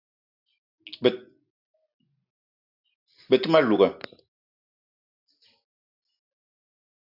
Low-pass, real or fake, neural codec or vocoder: 5.4 kHz; real; none